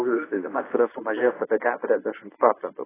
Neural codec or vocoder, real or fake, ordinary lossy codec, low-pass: codec, 24 kHz, 0.9 kbps, WavTokenizer, medium speech release version 1; fake; AAC, 16 kbps; 3.6 kHz